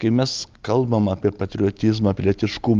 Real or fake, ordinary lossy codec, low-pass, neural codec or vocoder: real; Opus, 32 kbps; 7.2 kHz; none